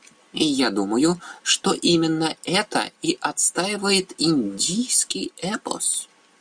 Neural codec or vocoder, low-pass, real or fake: none; 9.9 kHz; real